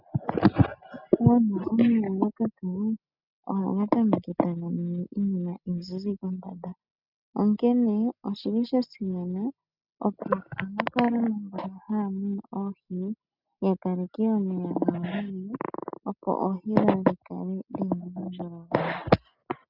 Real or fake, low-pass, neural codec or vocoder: real; 5.4 kHz; none